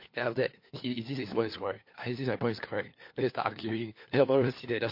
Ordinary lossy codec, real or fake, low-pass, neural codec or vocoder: MP3, 32 kbps; fake; 5.4 kHz; codec, 16 kHz, 4 kbps, FunCodec, trained on LibriTTS, 50 frames a second